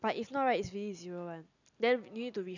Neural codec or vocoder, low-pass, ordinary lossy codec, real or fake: none; 7.2 kHz; none; real